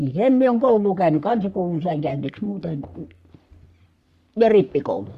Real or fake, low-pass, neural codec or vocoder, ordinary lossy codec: fake; 14.4 kHz; codec, 44.1 kHz, 3.4 kbps, Pupu-Codec; Opus, 64 kbps